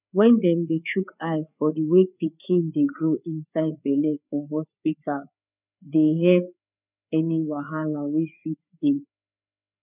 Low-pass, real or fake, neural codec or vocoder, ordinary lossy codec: 3.6 kHz; fake; codec, 16 kHz, 4 kbps, FreqCodec, larger model; none